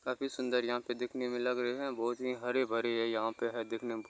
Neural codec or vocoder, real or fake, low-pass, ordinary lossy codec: none; real; none; none